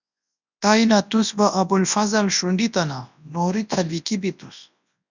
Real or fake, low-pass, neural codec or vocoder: fake; 7.2 kHz; codec, 24 kHz, 0.9 kbps, WavTokenizer, large speech release